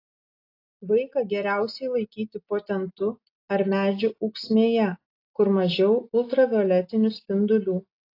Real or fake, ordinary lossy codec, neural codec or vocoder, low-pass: real; AAC, 32 kbps; none; 5.4 kHz